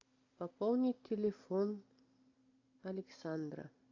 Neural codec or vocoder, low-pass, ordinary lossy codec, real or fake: none; 7.2 kHz; AAC, 48 kbps; real